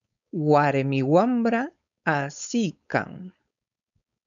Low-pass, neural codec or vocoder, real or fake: 7.2 kHz; codec, 16 kHz, 4.8 kbps, FACodec; fake